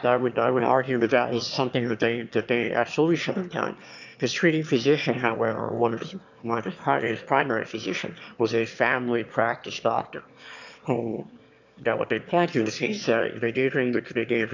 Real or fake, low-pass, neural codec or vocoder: fake; 7.2 kHz; autoencoder, 22.05 kHz, a latent of 192 numbers a frame, VITS, trained on one speaker